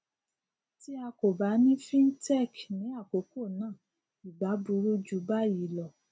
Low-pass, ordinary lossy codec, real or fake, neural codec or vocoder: none; none; real; none